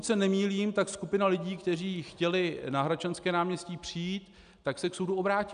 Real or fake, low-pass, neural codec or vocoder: real; 9.9 kHz; none